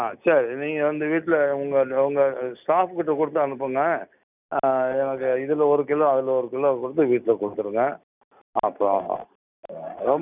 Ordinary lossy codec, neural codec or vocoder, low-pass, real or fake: none; none; 3.6 kHz; real